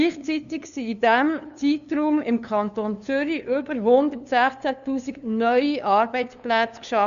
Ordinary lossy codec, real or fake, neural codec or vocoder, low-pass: none; fake; codec, 16 kHz, 2 kbps, FunCodec, trained on LibriTTS, 25 frames a second; 7.2 kHz